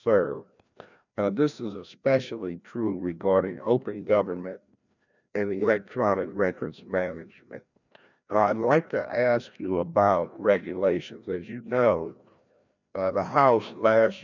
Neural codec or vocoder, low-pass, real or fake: codec, 16 kHz, 1 kbps, FreqCodec, larger model; 7.2 kHz; fake